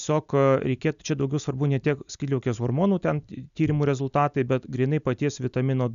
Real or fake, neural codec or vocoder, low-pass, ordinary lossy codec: real; none; 7.2 kHz; MP3, 96 kbps